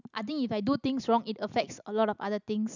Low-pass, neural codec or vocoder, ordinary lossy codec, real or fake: 7.2 kHz; none; none; real